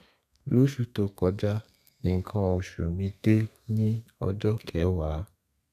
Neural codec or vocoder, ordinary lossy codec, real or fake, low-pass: codec, 32 kHz, 1.9 kbps, SNAC; none; fake; 14.4 kHz